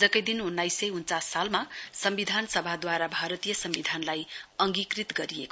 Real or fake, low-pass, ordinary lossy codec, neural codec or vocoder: real; none; none; none